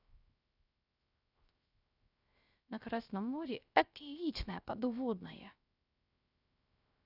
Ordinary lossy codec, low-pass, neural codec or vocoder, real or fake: none; 5.4 kHz; codec, 16 kHz, 0.3 kbps, FocalCodec; fake